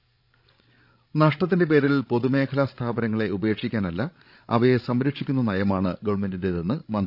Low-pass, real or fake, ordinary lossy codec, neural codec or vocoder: 5.4 kHz; fake; none; codec, 16 kHz, 16 kbps, FreqCodec, larger model